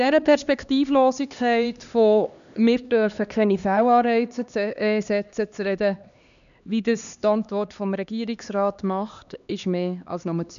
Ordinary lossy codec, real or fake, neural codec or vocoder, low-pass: none; fake; codec, 16 kHz, 2 kbps, X-Codec, HuBERT features, trained on LibriSpeech; 7.2 kHz